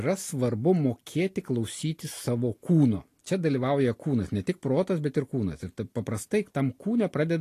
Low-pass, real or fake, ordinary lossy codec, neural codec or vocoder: 14.4 kHz; real; AAC, 48 kbps; none